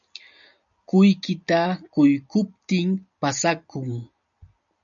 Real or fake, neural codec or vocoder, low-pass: real; none; 7.2 kHz